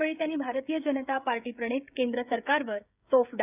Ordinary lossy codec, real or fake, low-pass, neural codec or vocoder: none; fake; 3.6 kHz; codec, 16 kHz, 16 kbps, FreqCodec, smaller model